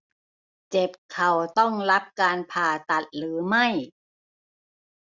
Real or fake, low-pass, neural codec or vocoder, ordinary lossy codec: real; 7.2 kHz; none; Opus, 64 kbps